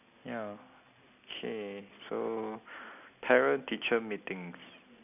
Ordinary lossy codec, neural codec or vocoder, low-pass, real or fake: none; none; 3.6 kHz; real